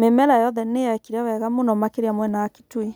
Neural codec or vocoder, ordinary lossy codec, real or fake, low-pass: none; none; real; none